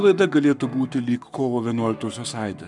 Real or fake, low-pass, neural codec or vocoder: fake; 10.8 kHz; codec, 44.1 kHz, 7.8 kbps, Pupu-Codec